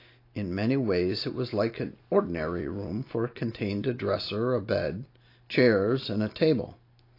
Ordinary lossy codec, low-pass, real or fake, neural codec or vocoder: AAC, 32 kbps; 5.4 kHz; real; none